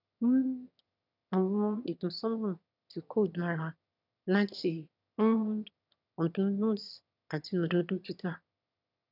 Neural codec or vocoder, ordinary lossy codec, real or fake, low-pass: autoencoder, 22.05 kHz, a latent of 192 numbers a frame, VITS, trained on one speaker; none; fake; 5.4 kHz